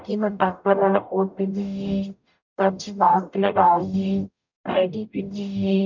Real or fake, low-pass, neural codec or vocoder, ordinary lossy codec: fake; 7.2 kHz; codec, 44.1 kHz, 0.9 kbps, DAC; none